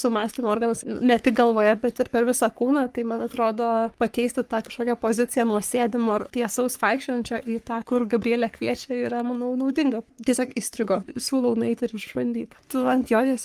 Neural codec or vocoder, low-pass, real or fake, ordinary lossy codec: codec, 44.1 kHz, 3.4 kbps, Pupu-Codec; 14.4 kHz; fake; Opus, 32 kbps